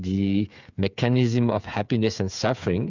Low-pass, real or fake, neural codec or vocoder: 7.2 kHz; fake; codec, 16 kHz, 8 kbps, FreqCodec, smaller model